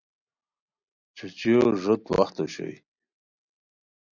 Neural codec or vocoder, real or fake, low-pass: none; real; 7.2 kHz